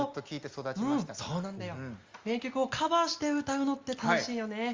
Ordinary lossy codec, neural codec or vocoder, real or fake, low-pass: Opus, 32 kbps; none; real; 7.2 kHz